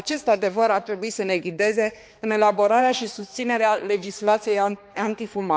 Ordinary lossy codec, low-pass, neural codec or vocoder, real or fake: none; none; codec, 16 kHz, 2 kbps, X-Codec, HuBERT features, trained on balanced general audio; fake